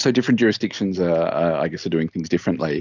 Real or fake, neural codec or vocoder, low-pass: real; none; 7.2 kHz